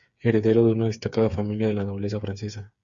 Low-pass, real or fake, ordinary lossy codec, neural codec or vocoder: 7.2 kHz; fake; Opus, 64 kbps; codec, 16 kHz, 8 kbps, FreqCodec, smaller model